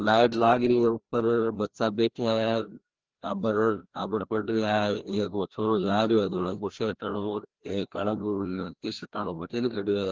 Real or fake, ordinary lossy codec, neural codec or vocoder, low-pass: fake; Opus, 16 kbps; codec, 16 kHz, 1 kbps, FreqCodec, larger model; 7.2 kHz